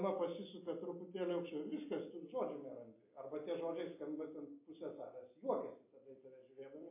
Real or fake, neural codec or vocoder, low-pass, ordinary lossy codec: real; none; 3.6 kHz; MP3, 24 kbps